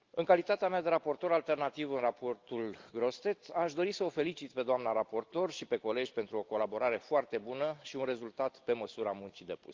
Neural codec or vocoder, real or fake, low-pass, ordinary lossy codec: none; real; 7.2 kHz; Opus, 32 kbps